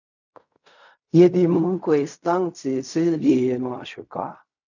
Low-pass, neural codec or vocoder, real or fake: 7.2 kHz; codec, 16 kHz in and 24 kHz out, 0.4 kbps, LongCat-Audio-Codec, fine tuned four codebook decoder; fake